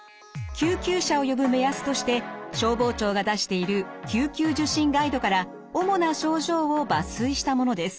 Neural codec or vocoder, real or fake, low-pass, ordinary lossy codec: none; real; none; none